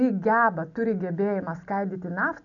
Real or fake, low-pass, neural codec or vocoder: real; 7.2 kHz; none